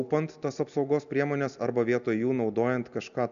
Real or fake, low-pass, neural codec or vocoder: real; 7.2 kHz; none